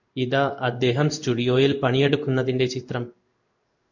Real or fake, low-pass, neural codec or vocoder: fake; 7.2 kHz; codec, 16 kHz in and 24 kHz out, 1 kbps, XY-Tokenizer